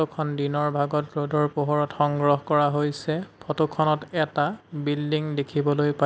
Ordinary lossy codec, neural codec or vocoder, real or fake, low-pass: none; none; real; none